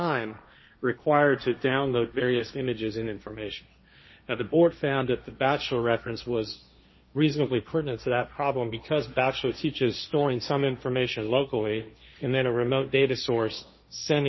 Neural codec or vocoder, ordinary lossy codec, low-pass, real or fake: codec, 16 kHz, 1.1 kbps, Voila-Tokenizer; MP3, 24 kbps; 7.2 kHz; fake